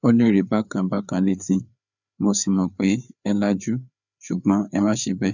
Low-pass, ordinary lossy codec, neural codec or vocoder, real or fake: 7.2 kHz; none; codec, 16 kHz, 4 kbps, FreqCodec, larger model; fake